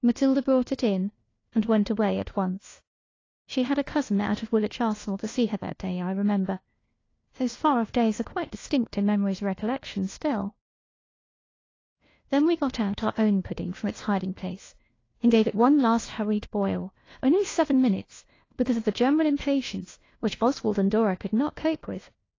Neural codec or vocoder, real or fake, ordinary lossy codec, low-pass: codec, 16 kHz, 1 kbps, FunCodec, trained on LibriTTS, 50 frames a second; fake; AAC, 32 kbps; 7.2 kHz